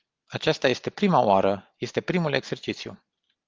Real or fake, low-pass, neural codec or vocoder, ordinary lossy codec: real; 7.2 kHz; none; Opus, 32 kbps